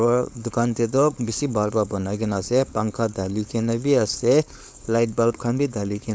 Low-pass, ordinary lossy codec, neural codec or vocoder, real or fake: none; none; codec, 16 kHz, 4.8 kbps, FACodec; fake